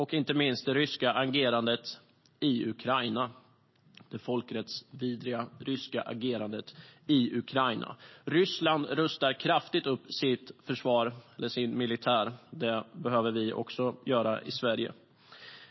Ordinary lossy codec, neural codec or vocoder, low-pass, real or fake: MP3, 24 kbps; none; 7.2 kHz; real